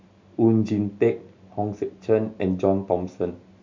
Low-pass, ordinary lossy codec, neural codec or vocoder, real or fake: 7.2 kHz; MP3, 64 kbps; none; real